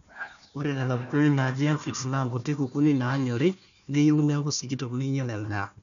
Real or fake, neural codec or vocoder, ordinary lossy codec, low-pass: fake; codec, 16 kHz, 1 kbps, FunCodec, trained on Chinese and English, 50 frames a second; none; 7.2 kHz